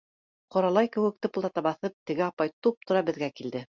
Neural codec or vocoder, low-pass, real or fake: none; 7.2 kHz; real